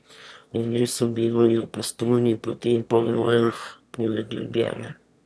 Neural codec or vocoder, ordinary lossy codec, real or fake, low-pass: autoencoder, 22.05 kHz, a latent of 192 numbers a frame, VITS, trained on one speaker; none; fake; none